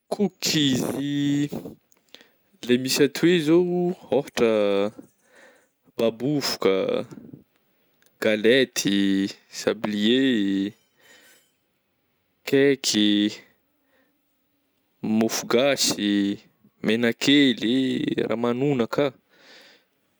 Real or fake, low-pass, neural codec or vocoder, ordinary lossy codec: real; none; none; none